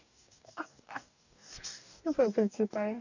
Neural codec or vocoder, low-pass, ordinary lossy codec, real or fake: codec, 44.1 kHz, 2.6 kbps, DAC; 7.2 kHz; none; fake